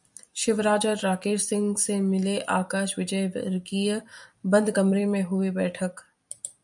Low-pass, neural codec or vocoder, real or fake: 10.8 kHz; vocoder, 44.1 kHz, 128 mel bands every 256 samples, BigVGAN v2; fake